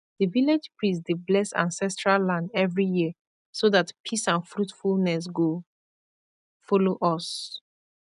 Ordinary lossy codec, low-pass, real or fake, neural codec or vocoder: none; 10.8 kHz; real; none